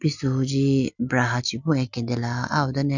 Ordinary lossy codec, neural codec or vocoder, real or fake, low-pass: none; none; real; 7.2 kHz